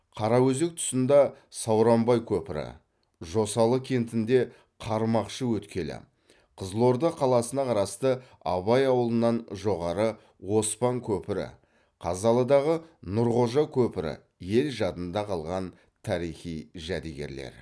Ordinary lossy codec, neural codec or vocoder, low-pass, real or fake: none; none; none; real